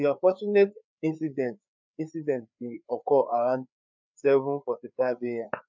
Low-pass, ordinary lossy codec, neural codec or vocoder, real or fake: 7.2 kHz; MP3, 64 kbps; codec, 16 kHz, 4 kbps, FreqCodec, larger model; fake